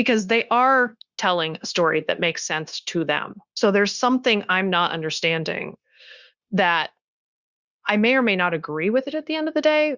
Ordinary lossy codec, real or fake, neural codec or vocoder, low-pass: Opus, 64 kbps; fake; codec, 16 kHz, 0.9 kbps, LongCat-Audio-Codec; 7.2 kHz